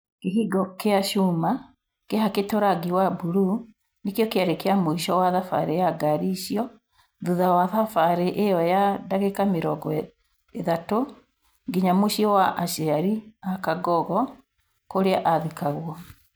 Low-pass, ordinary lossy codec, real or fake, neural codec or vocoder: none; none; real; none